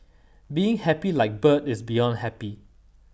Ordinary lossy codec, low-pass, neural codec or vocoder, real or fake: none; none; none; real